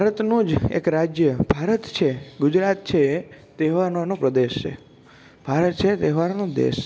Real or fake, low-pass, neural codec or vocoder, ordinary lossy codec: real; none; none; none